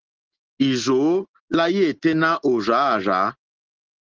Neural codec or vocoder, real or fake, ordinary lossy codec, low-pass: none; real; Opus, 16 kbps; 7.2 kHz